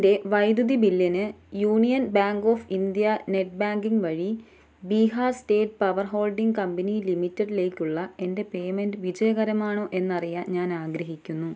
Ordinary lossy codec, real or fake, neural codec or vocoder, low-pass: none; real; none; none